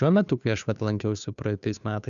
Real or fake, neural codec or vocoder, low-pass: fake; codec, 16 kHz, 2 kbps, FunCodec, trained on Chinese and English, 25 frames a second; 7.2 kHz